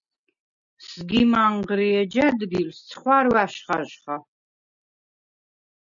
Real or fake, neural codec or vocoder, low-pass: real; none; 7.2 kHz